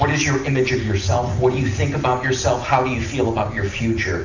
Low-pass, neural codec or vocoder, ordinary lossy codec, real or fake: 7.2 kHz; none; Opus, 64 kbps; real